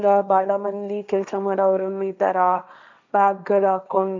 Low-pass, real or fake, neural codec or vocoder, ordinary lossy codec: 7.2 kHz; fake; codec, 16 kHz, 1.1 kbps, Voila-Tokenizer; none